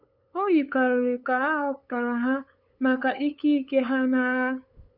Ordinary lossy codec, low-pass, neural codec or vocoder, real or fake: none; 5.4 kHz; codec, 16 kHz, 8 kbps, FunCodec, trained on LibriTTS, 25 frames a second; fake